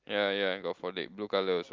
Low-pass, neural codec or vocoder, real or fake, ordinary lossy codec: 7.2 kHz; none; real; Opus, 32 kbps